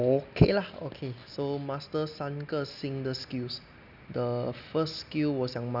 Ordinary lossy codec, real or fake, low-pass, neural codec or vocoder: none; real; 5.4 kHz; none